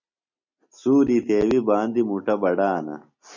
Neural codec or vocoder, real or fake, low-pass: none; real; 7.2 kHz